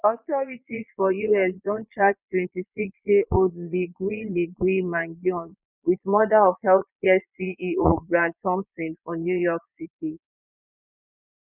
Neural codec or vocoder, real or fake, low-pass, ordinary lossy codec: vocoder, 22.05 kHz, 80 mel bands, Vocos; fake; 3.6 kHz; none